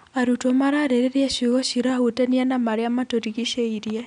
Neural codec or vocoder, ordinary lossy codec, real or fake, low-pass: none; none; real; 9.9 kHz